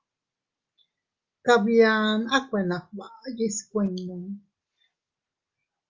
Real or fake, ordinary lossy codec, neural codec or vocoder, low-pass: real; Opus, 32 kbps; none; 7.2 kHz